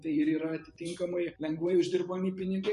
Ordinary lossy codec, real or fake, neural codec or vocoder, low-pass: MP3, 48 kbps; fake; vocoder, 44.1 kHz, 128 mel bands every 512 samples, BigVGAN v2; 14.4 kHz